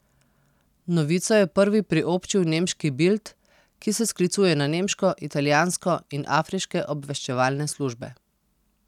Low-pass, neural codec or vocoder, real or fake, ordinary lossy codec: 19.8 kHz; none; real; none